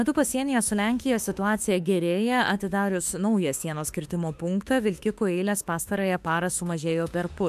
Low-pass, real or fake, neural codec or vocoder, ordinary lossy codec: 14.4 kHz; fake; autoencoder, 48 kHz, 32 numbers a frame, DAC-VAE, trained on Japanese speech; AAC, 96 kbps